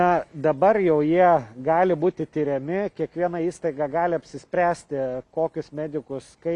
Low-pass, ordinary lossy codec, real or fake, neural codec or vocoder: 10.8 kHz; MP3, 96 kbps; real; none